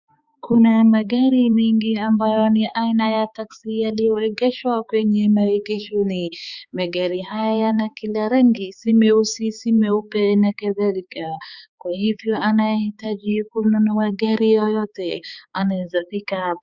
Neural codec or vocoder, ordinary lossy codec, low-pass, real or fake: codec, 16 kHz, 4 kbps, X-Codec, HuBERT features, trained on balanced general audio; Opus, 64 kbps; 7.2 kHz; fake